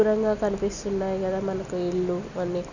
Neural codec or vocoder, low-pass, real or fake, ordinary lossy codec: none; 7.2 kHz; real; none